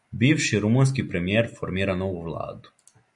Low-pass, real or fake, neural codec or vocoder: 10.8 kHz; real; none